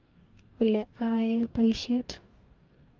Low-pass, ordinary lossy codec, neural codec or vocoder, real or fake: 7.2 kHz; Opus, 32 kbps; codec, 44.1 kHz, 2.6 kbps, DAC; fake